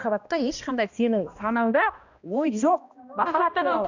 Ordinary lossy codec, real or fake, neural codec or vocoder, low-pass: none; fake; codec, 16 kHz, 1 kbps, X-Codec, HuBERT features, trained on balanced general audio; 7.2 kHz